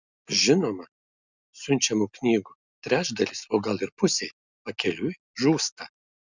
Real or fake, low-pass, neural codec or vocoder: real; 7.2 kHz; none